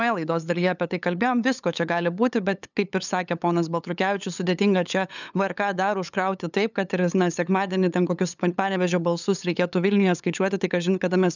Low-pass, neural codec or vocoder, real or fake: 7.2 kHz; codec, 16 kHz, 8 kbps, FunCodec, trained on LibriTTS, 25 frames a second; fake